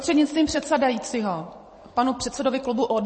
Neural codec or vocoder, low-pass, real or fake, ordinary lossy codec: vocoder, 44.1 kHz, 128 mel bands every 512 samples, BigVGAN v2; 9.9 kHz; fake; MP3, 32 kbps